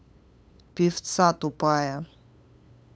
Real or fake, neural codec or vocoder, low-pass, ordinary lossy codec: fake; codec, 16 kHz, 8 kbps, FunCodec, trained on LibriTTS, 25 frames a second; none; none